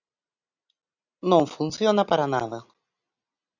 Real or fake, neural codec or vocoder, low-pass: real; none; 7.2 kHz